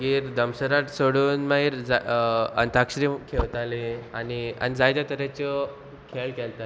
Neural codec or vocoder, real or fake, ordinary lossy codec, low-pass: none; real; none; none